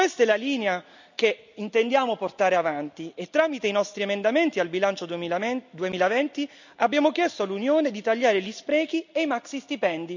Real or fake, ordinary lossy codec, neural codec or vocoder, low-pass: real; none; none; 7.2 kHz